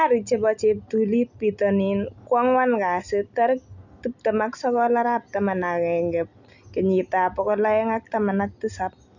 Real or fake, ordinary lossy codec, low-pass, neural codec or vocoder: real; none; 7.2 kHz; none